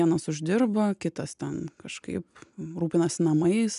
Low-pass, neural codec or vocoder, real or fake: 10.8 kHz; none; real